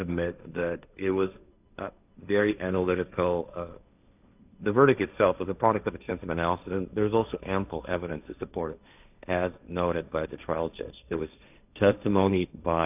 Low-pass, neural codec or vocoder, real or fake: 3.6 kHz; codec, 16 kHz, 1.1 kbps, Voila-Tokenizer; fake